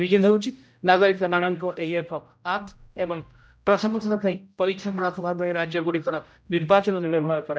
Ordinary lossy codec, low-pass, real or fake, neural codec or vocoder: none; none; fake; codec, 16 kHz, 0.5 kbps, X-Codec, HuBERT features, trained on general audio